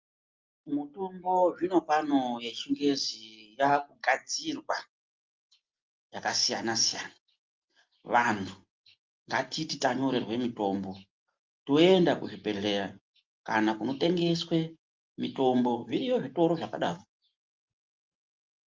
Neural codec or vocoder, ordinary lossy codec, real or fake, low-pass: none; Opus, 24 kbps; real; 7.2 kHz